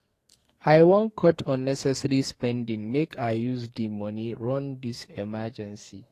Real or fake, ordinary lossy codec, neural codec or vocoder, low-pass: fake; AAC, 48 kbps; codec, 44.1 kHz, 2.6 kbps, SNAC; 14.4 kHz